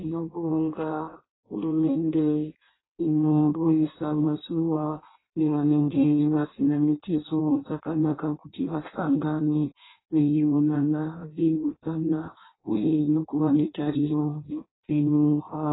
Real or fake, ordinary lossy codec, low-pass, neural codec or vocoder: fake; AAC, 16 kbps; 7.2 kHz; codec, 16 kHz in and 24 kHz out, 0.6 kbps, FireRedTTS-2 codec